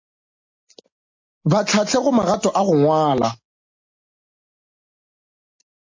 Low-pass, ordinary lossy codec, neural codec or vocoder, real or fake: 7.2 kHz; MP3, 32 kbps; none; real